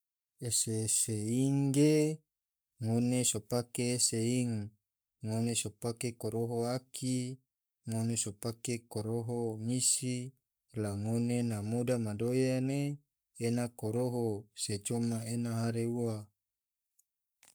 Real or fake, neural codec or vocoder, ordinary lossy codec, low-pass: fake; codec, 44.1 kHz, 7.8 kbps, Pupu-Codec; none; none